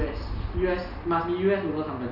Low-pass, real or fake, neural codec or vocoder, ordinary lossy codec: 5.4 kHz; real; none; none